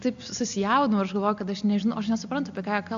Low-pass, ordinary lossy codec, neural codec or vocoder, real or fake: 7.2 kHz; AAC, 64 kbps; none; real